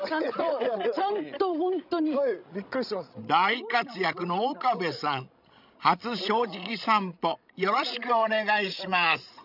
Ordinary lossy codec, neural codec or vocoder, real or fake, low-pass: none; codec, 16 kHz, 16 kbps, FreqCodec, larger model; fake; 5.4 kHz